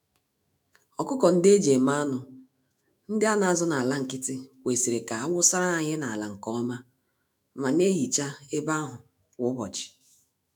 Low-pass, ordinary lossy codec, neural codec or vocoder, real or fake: none; none; autoencoder, 48 kHz, 128 numbers a frame, DAC-VAE, trained on Japanese speech; fake